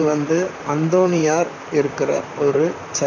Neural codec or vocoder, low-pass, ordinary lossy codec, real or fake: vocoder, 44.1 kHz, 128 mel bands, Pupu-Vocoder; 7.2 kHz; none; fake